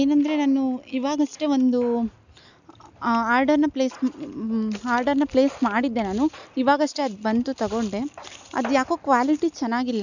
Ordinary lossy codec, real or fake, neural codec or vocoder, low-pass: none; real; none; 7.2 kHz